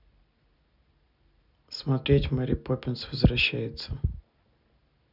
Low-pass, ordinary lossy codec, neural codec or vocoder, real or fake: 5.4 kHz; none; none; real